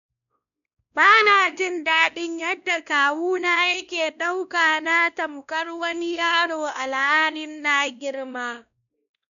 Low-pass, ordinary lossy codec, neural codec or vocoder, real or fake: 7.2 kHz; none; codec, 16 kHz, 1 kbps, X-Codec, WavLM features, trained on Multilingual LibriSpeech; fake